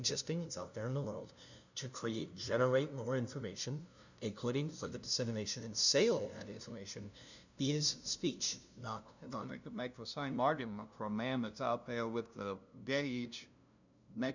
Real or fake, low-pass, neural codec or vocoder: fake; 7.2 kHz; codec, 16 kHz, 0.5 kbps, FunCodec, trained on LibriTTS, 25 frames a second